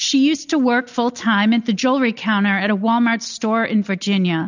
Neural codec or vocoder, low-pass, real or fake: none; 7.2 kHz; real